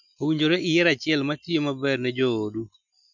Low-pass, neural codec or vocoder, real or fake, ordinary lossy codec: 7.2 kHz; none; real; none